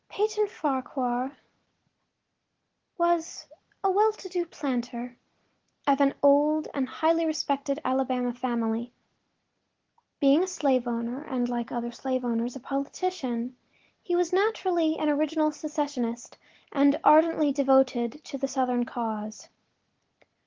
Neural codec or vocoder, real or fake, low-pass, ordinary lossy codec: none; real; 7.2 kHz; Opus, 16 kbps